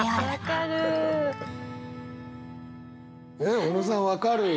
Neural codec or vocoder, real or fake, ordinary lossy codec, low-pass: none; real; none; none